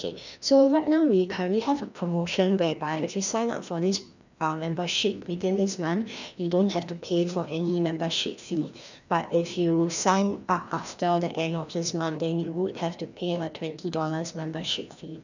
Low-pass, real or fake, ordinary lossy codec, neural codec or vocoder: 7.2 kHz; fake; none; codec, 16 kHz, 1 kbps, FreqCodec, larger model